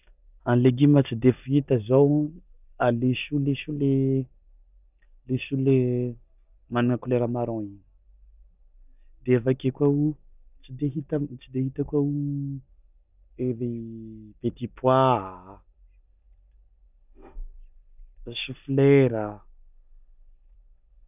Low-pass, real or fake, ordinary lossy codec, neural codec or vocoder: 3.6 kHz; real; none; none